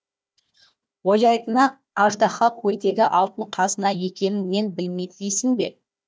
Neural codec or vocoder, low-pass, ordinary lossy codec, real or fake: codec, 16 kHz, 1 kbps, FunCodec, trained on Chinese and English, 50 frames a second; none; none; fake